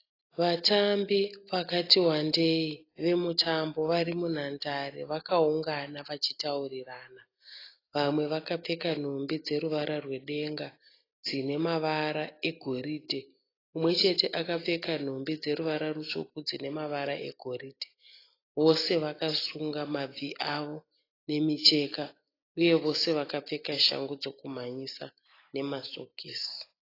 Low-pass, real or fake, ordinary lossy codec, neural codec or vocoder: 5.4 kHz; real; AAC, 24 kbps; none